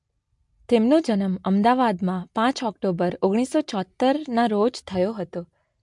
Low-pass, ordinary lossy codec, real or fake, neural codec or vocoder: 10.8 kHz; MP3, 64 kbps; real; none